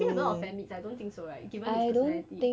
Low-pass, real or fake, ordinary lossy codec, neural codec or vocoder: none; real; none; none